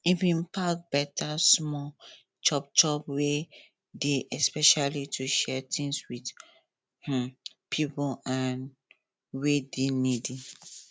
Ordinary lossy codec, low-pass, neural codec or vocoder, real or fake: none; none; none; real